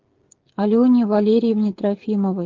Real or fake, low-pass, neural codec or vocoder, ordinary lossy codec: fake; 7.2 kHz; codec, 16 kHz, 16 kbps, FreqCodec, smaller model; Opus, 16 kbps